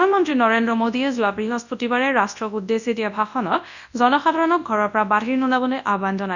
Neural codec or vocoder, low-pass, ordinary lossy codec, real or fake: codec, 24 kHz, 0.9 kbps, WavTokenizer, large speech release; 7.2 kHz; none; fake